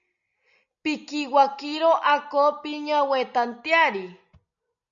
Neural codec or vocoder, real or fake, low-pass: none; real; 7.2 kHz